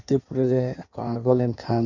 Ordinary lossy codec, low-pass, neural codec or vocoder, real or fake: none; 7.2 kHz; codec, 16 kHz in and 24 kHz out, 1.1 kbps, FireRedTTS-2 codec; fake